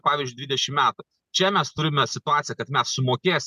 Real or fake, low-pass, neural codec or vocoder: real; 14.4 kHz; none